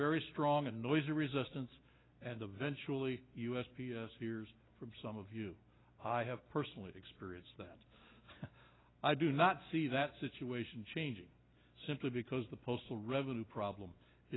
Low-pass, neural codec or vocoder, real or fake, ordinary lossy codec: 7.2 kHz; none; real; AAC, 16 kbps